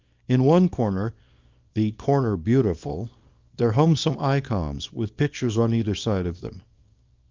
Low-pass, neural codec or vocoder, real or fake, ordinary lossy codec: 7.2 kHz; codec, 24 kHz, 0.9 kbps, WavTokenizer, small release; fake; Opus, 24 kbps